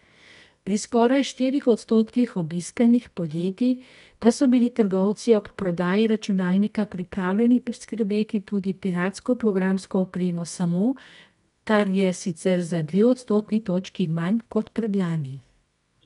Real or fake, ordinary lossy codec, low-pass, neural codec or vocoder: fake; none; 10.8 kHz; codec, 24 kHz, 0.9 kbps, WavTokenizer, medium music audio release